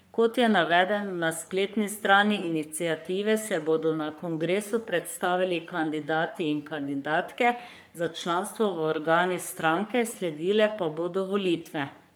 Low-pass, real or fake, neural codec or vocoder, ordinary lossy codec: none; fake; codec, 44.1 kHz, 3.4 kbps, Pupu-Codec; none